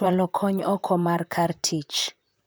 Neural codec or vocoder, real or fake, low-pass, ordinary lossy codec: vocoder, 44.1 kHz, 128 mel bands, Pupu-Vocoder; fake; none; none